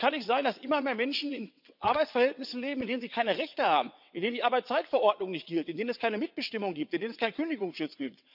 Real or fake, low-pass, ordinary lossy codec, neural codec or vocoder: fake; 5.4 kHz; none; vocoder, 22.05 kHz, 80 mel bands, WaveNeXt